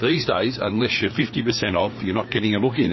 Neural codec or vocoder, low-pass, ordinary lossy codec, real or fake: codec, 16 kHz, 4 kbps, FreqCodec, larger model; 7.2 kHz; MP3, 24 kbps; fake